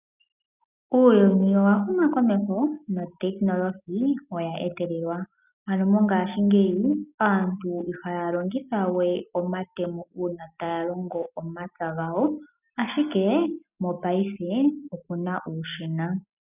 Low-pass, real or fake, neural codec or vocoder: 3.6 kHz; real; none